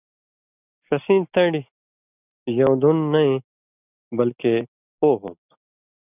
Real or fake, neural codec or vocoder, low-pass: real; none; 3.6 kHz